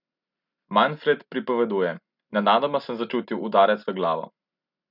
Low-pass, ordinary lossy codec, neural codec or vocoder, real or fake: 5.4 kHz; none; none; real